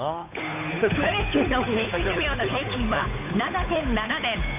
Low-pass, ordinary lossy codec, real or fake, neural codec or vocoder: 3.6 kHz; none; fake; codec, 16 kHz, 8 kbps, FunCodec, trained on Chinese and English, 25 frames a second